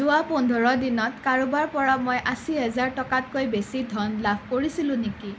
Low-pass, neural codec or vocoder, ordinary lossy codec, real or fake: none; none; none; real